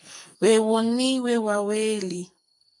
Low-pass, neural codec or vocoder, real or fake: 10.8 kHz; codec, 44.1 kHz, 2.6 kbps, SNAC; fake